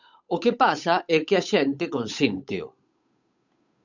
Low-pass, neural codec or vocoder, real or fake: 7.2 kHz; codec, 16 kHz, 8 kbps, FunCodec, trained on Chinese and English, 25 frames a second; fake